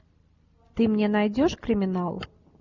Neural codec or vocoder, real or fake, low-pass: none; real; 7.2 kHz